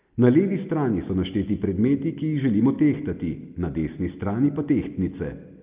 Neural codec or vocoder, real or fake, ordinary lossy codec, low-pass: none; real; Opus, 24 kbps; 3.6 kHz